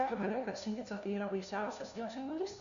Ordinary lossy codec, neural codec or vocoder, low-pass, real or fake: AAC, 96 kbps; codec, 16 kHz, 1 kbps, FunCodec, trained on LibriTTS, 50 frames a second; 7.2 kHz; fake